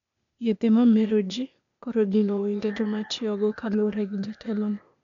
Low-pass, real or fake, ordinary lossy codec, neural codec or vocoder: 7.2 kHz; fake; none; codec, 16 kHz, 0.8 kbps, ZipCodec